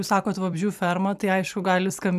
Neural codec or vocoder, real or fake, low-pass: vocoder, 44.1 kHz, 128 mel bands every 256 samples, BigVGAN v2; fake; 14.4 kHz